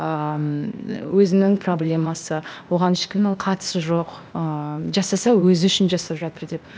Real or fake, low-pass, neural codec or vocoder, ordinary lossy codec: fake; none; codec, 16 kHz, 0.8 kbps, ZipCodec; none